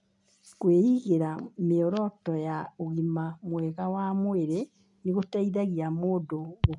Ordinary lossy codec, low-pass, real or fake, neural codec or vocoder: none; 10.8 kHz; real; none